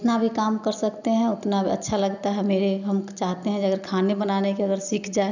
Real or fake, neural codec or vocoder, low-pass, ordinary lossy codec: real; none; 7.2 kHz; none